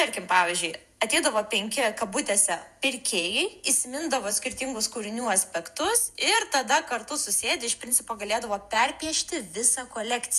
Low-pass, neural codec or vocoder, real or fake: 14.4 kHz; vocoder, 48 kHz, 128 mel bands, Vocos; fake